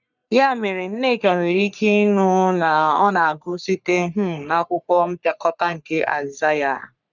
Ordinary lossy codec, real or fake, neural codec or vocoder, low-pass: none; fake; codec, 44.1 kHz, 3.4 kbps, Pupu-Codec; 7.2 kHz